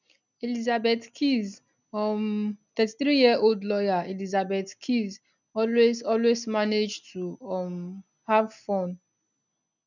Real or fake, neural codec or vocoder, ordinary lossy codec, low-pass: real; none; none; 7.2 kHz